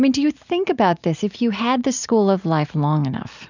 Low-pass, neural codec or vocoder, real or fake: 7.2 kHz; none; real